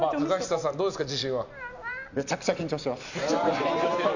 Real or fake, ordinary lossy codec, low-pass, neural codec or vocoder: real; none; 7.2 kHz; none